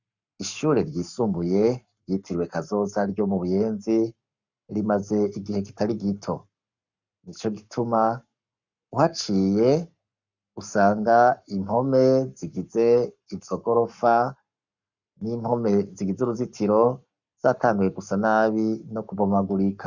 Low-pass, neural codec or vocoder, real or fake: 7.2 kHz; codec, 44.1 kHz, 7.8 kbps, Pupu-Codec; fake